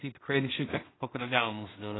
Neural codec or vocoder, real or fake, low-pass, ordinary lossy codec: codec, 16 kHz in and 24 kHz out, 0.4 kbps, LongCat-Audio-Codec, two codebook decoder; fake; 7.2 kHz; AAC, 16 kbps